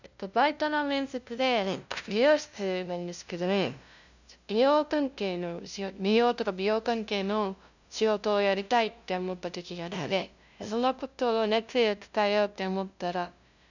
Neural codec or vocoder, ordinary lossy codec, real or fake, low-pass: codec, 16 kHz, 0.5 kbps, FunCodec, trained on LibriTTS, 25 frames a second; none; fake; 7.2 kHz